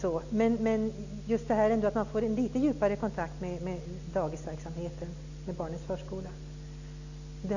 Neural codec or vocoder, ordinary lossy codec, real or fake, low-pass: none; none; real; 7.2 kHz